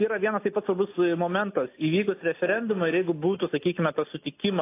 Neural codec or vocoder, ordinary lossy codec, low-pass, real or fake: none; AAC, 24 kbps; 3.6 kHz; real